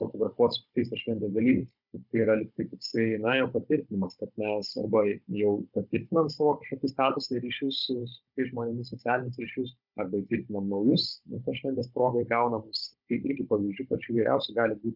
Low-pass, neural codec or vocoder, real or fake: 5.4 kHz; codec, 16 kHz, 16 kbps, FunCodec, trained on Chinese and English, 50 frames a second; fake